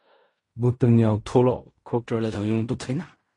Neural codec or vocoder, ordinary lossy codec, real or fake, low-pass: codec, 16 kHz in and 24 kHz out, 0.4 kbps, LongCat-Audio-Codec, fine tuned four codebook decoder; MP3, 48 kbps; fake; 10.8 kHz